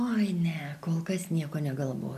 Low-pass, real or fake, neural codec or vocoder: 14.4 kHz; real; none